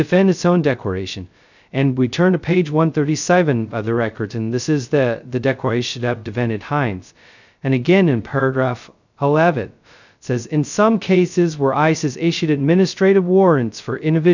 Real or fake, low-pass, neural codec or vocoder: fake; 7.2 kHz; codec, 16 kHz, 0.2 kbps, FocalCodec